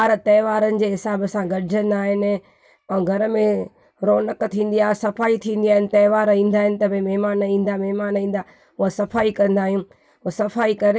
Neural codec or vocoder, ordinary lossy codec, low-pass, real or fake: none; none; none; real